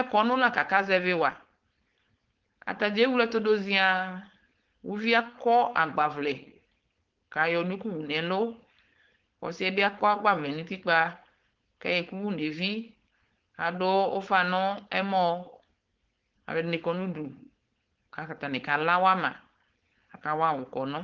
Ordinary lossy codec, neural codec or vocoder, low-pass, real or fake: Opus, 16 kbps; codec, 16 kHz, 4.8 kbps, FACodec; 7.2 kHz; fake